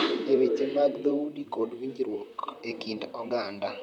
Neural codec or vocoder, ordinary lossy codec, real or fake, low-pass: vocoder, 44.1 kHz, 128 mel bands, Pupu-Vocoder; none; fake; 19.8 kHz